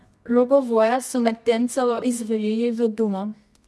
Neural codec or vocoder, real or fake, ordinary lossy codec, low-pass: codec, 24 kHz, 0.9 kbps, WavTokenizer, medium music audio release; fake; none; none